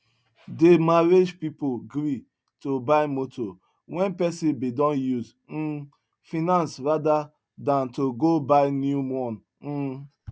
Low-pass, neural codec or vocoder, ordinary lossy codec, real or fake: none; none; none; real